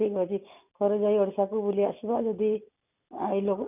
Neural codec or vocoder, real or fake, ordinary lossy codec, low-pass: none; real; none; 3.6 kHz